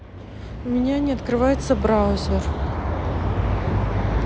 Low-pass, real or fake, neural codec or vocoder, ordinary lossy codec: none; real; none; none